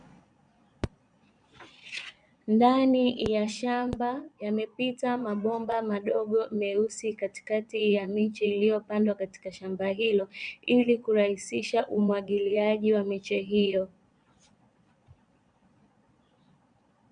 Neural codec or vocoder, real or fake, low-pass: vocoder, 22.05 kHz, 80 mel bands, Vocos; fake; 9.9 kHz